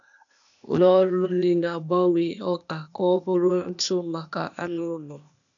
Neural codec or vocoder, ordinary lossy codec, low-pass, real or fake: codec, 16 kHz, 0.8 kbps, ZipCodec; none; 7.2 kHz; fake